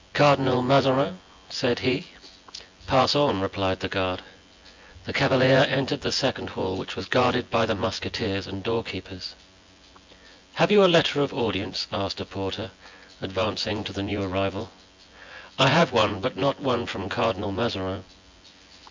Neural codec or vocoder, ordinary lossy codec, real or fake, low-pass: vocoder, 24 kHz, 100 mel bands, Vocos; MP3, 64 kbps; fake; 7.2 kHz